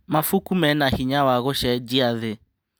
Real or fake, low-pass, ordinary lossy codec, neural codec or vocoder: real; none; none; none